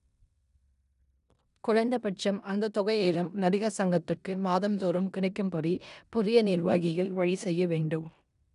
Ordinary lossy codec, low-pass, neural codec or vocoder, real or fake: none; 10.8 kHz; codec, 16 kHz in and 24 kHz out, 0.9 kbps, LongCat-Audio-Codec, four codebook decoder; fake